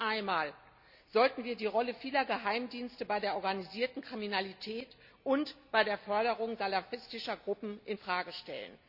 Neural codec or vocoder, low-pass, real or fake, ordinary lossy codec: none; 5.4 kHz; real; none